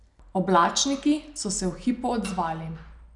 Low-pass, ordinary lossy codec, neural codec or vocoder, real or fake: 10.8 kHz; none; none; real